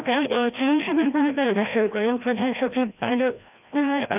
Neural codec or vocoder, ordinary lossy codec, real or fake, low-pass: codec, 16 kHz, 1 kbps, FreqCodec, larger model; none; fake; 3.6 kHz